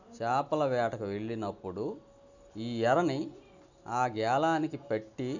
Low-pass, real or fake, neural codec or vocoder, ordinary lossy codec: 7.2 kHz; real; none; none